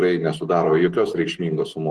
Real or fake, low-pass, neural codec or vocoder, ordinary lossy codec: real; 10.8 kHz; none; Opus, 16 kbps